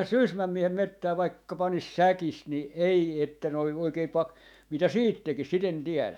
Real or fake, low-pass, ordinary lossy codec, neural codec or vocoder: fake; 19.8 kHz; none; autoencoder, 48 kHz, 128 numbers a frame, DAC-VAE, trained on Japanese speech